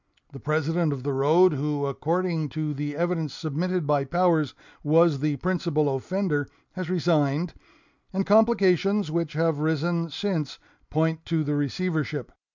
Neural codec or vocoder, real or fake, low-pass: none; real; 7.2 kHz